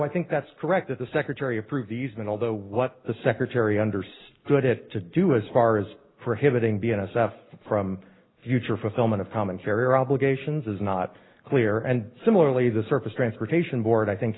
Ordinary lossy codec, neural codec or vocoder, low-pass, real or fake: AAC, 16 kbps; none; 7.2 kHz; real